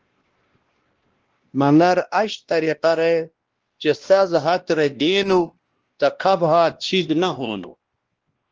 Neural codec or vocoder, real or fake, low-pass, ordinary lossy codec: codec, 16 kHz, 1 kbps, X-Codec, WavLM features, trained on Multilingual LibriSpeech; fake; 7.2 kHz; Opus, 16 kbps